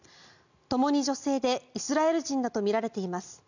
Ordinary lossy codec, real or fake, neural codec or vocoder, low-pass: none; real; none; 7.2 kHz